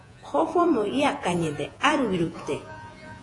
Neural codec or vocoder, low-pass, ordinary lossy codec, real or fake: vocoder, 48 kHz, 128 mel bands, Vocos; 10.8 kHz; AAC, 48 kbps; fake